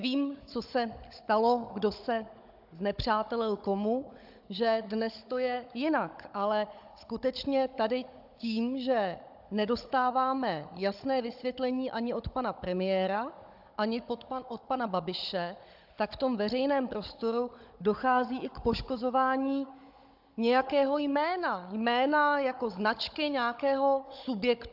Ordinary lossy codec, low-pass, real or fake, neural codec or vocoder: AAC, 48 kbps; 5.4 kHz; fake; codec, 16 kHz, 16 kbps, FunCodec, trained on Chinese and English, 50 frames a second